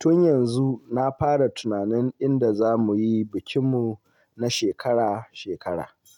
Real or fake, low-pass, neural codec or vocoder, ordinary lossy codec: real; 19.8 kHz; none; none